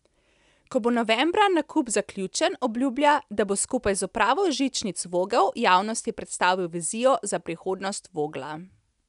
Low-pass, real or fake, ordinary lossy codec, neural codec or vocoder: 10.8 kHz; real; none; none